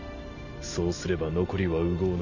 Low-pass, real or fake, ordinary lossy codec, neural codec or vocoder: 7.2 kHz; real; none; none